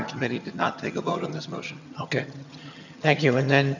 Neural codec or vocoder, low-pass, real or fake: vocoder, 22.05 kHz, 80 mel bands, HiFi-GAN; 7.2 kHz; fake